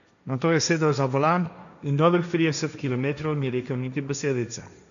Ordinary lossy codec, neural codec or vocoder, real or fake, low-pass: none; codec, 16 kHz, 1.1 kbps, Voila-Tokenizer; fake; 7.2 kHz